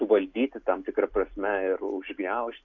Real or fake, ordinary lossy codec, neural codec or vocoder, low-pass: real; AAC, 48 kbps; none; 7.2 kHz